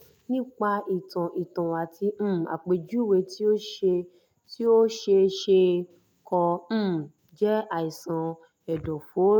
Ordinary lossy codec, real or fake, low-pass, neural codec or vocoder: none; real; 19.8 kHz; none